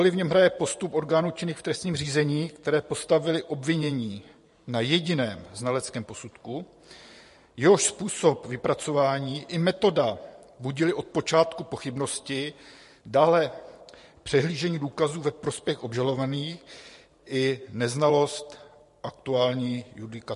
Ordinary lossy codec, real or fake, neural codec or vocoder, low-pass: MP3, 48 kbps; fake; vocoder, 44.1 kHz, 128 mel bands every 512 samples, BigVGAN v2; 14.4 kHz